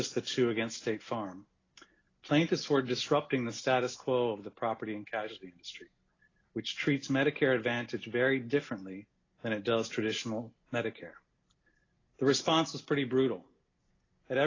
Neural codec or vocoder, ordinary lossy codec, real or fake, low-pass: none; AAC, 32 kbps; real; 7.2 kHz